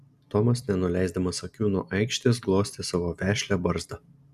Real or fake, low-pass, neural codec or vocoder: real; 14.4 kHz; none